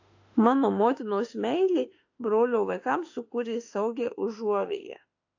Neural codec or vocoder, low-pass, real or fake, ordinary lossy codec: autoencoder, 48 kHz, 32 numbers a frame, DAC-VAE, trained on Japanese speech; 7.2 kHz; fake; AAC, 48 kbps